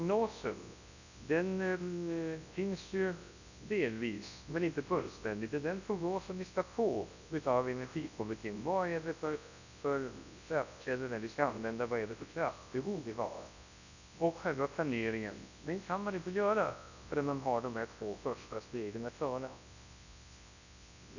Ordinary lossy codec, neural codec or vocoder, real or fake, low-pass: none; codec, 24 kHz, 0.9 kbps, WavTokenizer, large speech release; fake; 7.2 kHz